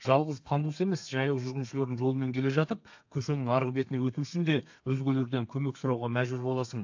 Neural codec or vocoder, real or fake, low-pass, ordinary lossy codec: codec, 32 kHz, 1.9 kbps, SNAC; fake; 7.2 kHz; AAC, 48 kbps